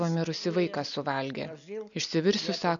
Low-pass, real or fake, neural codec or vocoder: 7.2 kHz; real; none